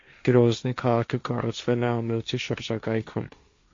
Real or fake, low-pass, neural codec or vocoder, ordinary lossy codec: fake; 7.2 kHz; codec, 16 kHz, 1.1 kbps, Voila-Tokenizer; MP3, 48 kbps